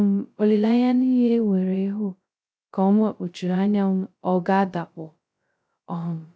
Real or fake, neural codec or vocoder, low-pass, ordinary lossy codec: fake; codec, 16 kHz, 0.2 kbps, FocalCodec; none; none